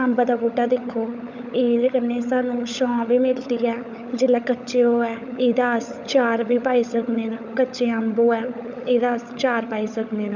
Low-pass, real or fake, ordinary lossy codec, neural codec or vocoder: 7.2 kHz; fake; none; codec, 16 kHz, 16 kbps, FunCodec, trained on LibriTTS, 50 frames a second